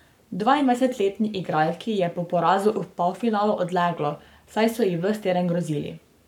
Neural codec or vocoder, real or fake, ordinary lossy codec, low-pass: codec, 44.1 kHz, 7.8 kbps, Pupu-Codec; fake; none; 19.8 kHz